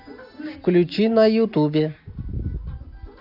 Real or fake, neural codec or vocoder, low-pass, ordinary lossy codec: real; none; 5.4 kHz; none